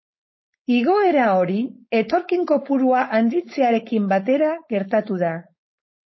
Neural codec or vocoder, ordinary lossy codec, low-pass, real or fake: codec, 16 kHz, 4.8 kbps, FACodec; MP3, 24 kbps; 7.2 kHz; fake